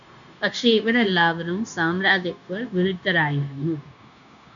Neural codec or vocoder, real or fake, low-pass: codec, 16 kHz, 0.9 kbps, LongCat-Audio-Codec; fake; 7.2 kHz